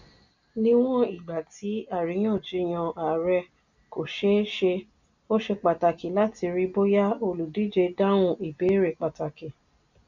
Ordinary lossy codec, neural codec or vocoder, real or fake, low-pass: none; none; real; 7.2 kHz